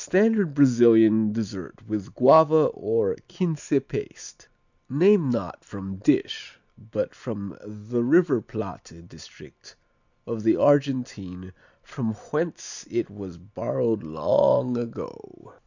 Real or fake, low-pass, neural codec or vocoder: real; 7.2 kHz; none